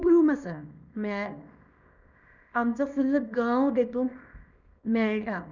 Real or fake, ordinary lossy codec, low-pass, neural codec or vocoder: fake; none; 7.2 kHz; codec, 24 kHz, 0.9 kbps, WavTokenizer, small release